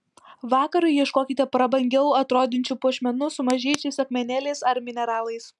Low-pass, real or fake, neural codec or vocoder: 10.8 kHz; real; none